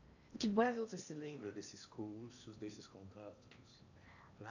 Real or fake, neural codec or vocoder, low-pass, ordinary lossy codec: fake; codec, 16 kHz in and 24 kHz out, 0.8 kbps, FocalCodec, streaming, 65536 codes; 7.2 kHz; none